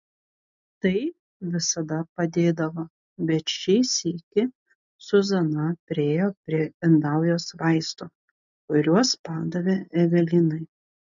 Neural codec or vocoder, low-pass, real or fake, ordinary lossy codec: none; 7.2 kHz; real; MP3, 64 kbps